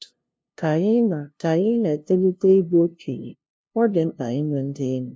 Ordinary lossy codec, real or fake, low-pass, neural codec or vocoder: none; fake; none; codec, 16 kHz, 0.5 kbps, FunCodec, trained on LibriTTS, 25 frames a second